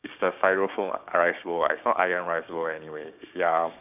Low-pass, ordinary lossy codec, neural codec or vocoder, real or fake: 3.6 kHz; none; codec, 16 kHz, 6 kbps, DAC; fake